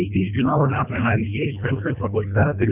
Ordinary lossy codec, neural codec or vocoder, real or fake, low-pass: none; codec, 24 kHz, 1.5 kbps, HILCodec; fake; 3.6 kHz